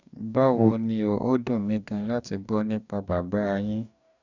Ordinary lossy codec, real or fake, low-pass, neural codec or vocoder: none; fake; 7.2 kHz; codec, 44.1 kHz, 2.6 kbps, DAC